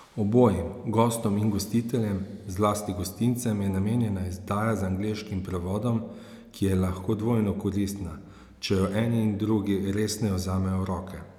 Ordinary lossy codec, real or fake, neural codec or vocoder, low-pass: none; real; none; 19.8 kHz